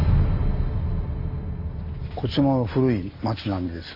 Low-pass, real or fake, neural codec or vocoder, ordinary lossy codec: 5.4 kHz; real; none; MP3, 48 kbps